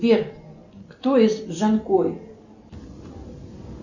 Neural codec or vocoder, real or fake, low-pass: none; real; 7.2 kHz